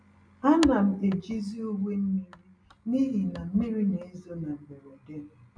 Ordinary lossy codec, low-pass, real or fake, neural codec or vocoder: none; 9.9 kHz; real; none